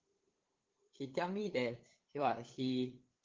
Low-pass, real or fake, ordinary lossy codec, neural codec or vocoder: 7.2 kHz; fake; Opus, 16 kbps; codec, 16 kHz, 8 kbps, FunCodec, trained on LibriTTS, 25 frames a second